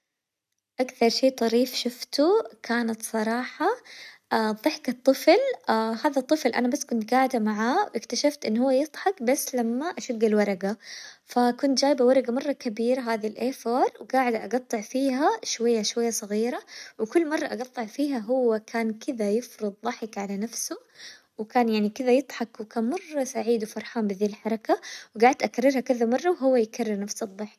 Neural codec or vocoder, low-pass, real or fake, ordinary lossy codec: none; 14.4 kHz; real; none